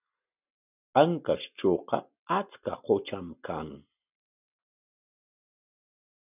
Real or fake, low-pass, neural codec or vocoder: real; 3.6 kHz; none